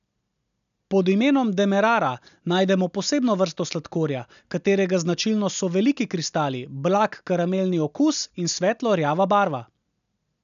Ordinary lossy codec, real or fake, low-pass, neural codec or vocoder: none; real; 7.2 kHz; none